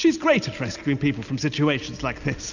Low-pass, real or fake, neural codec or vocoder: 7.2 kHz; real; none